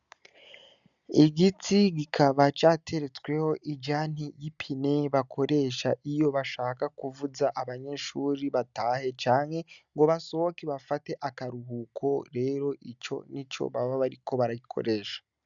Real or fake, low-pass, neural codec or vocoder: real; 7.2 kHz; none